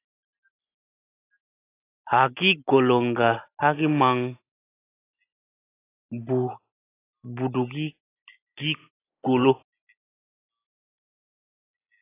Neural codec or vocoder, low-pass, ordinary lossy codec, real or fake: none; 3.6 kHz; AAC, 24 kbps; real